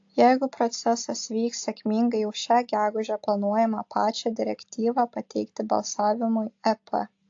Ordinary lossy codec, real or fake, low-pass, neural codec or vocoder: AAC, 48 kbps; real; 7.2 kHz; none